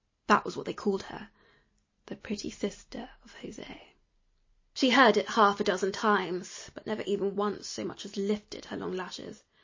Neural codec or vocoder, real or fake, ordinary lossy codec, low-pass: none; real; MP3, 32 kbps; 7.2 kHz